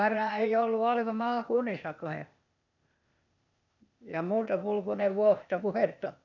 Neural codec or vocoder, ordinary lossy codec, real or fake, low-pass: codec, 16 kHz, 0.8 kbps, ZipCodec; none; fake; 7.2 kHz